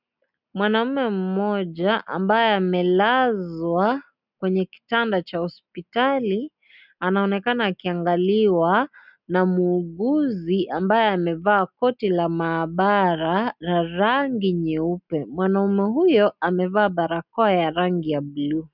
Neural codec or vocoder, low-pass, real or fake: none; 5.4 kHz; real